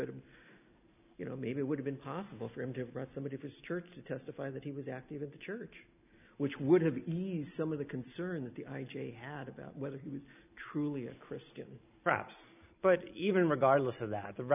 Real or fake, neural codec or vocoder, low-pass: real; none; 3.6 kHz